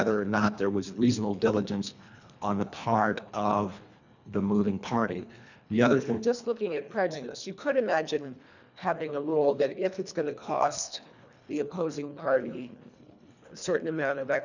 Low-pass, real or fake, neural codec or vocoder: 7.2 kHz; fake; codec, 24 kHz, 1.5 kbps, HILCodec